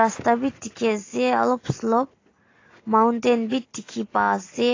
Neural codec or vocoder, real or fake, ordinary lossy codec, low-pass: none; real; AAC, 32 kbps; 7.2 kHz